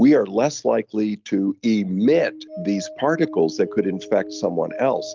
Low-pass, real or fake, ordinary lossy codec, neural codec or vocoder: 7.2 kHz; real; Opus, 24 kbps; none